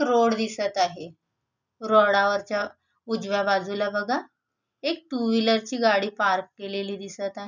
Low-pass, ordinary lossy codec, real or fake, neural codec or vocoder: 7.2 kHz; none; real; none